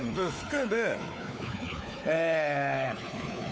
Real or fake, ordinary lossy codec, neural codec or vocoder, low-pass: fake; none; codec, 16 kHz, 4 kbps, X-Codec, WavLM features, trained on Multilingual LibriSpeech; none